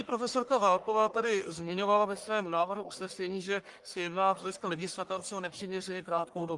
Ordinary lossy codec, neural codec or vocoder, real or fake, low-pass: Opus, 32 kbps; codec, 44.1 kHz, 1.7 kbps, Pupu-Codec; fake; 10.8 kHz